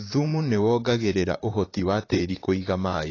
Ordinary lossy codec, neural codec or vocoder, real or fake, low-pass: AAC, 32 kbps; vocoder, 44.1 kHz, 128 mel bands, Pupu-Vocoder; fake; 7.2 kHz